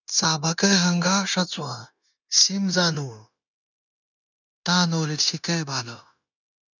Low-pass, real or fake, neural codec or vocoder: 7.2 kHz; fake; codec, 16 kHz, 0.9 kbps, LongCat-Audio-Codec